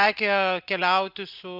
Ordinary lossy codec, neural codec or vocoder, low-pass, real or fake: Opus, 64 kbps; none; 5.4 kHz; real